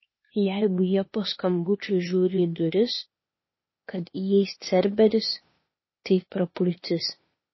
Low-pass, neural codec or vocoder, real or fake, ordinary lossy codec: 7.2 kHz; codec, 16 kHz, 0.8 kbps, ZipCodec; fake; MP3, 24 kbps